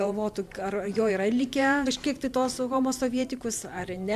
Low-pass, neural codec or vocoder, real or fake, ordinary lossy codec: 14.4 kHz; vocoder, 44.1 kHz, 128 mel bands every 512 samples, BigVGAN v2; fake; MP3, 96 kbps